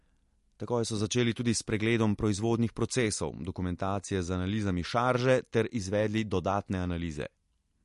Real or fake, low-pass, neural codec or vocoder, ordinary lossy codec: real; 14.4 kHz; none; MP3, 48 kbps